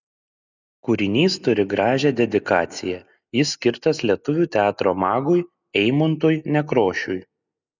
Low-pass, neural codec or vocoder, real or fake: 7.2 kHz; none; real